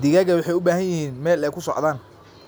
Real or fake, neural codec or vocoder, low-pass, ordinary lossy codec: real; none; none; none